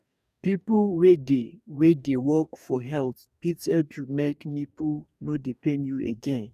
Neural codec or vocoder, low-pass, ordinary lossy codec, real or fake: codec, 44.1 kHz, 2.6 kbps, DAC; 14.4 kHz; none; fake